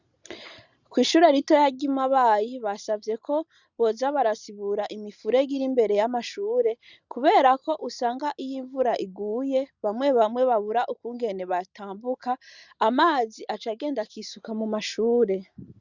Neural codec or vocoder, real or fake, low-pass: none; real; 7.2 kHz